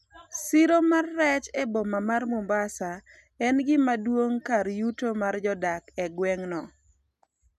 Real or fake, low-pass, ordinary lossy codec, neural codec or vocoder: real; 14.4 kHz; none; none